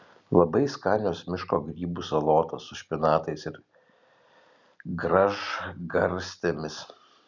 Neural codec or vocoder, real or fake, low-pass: none; real; 7.2 kHz